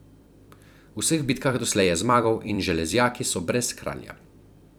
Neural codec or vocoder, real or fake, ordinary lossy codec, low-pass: vocoder, 44.1 kHz, 128 mel bands every 256 samples, BigVGAN v2; fake; none; none